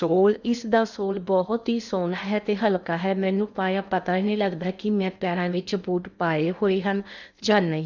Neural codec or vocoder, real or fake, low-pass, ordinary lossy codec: codec, 16 kHz in and 24 kHz out, 0.8 kbps, FocalCodec, streaming, 65536 codes; fake; 7.2 kHz; none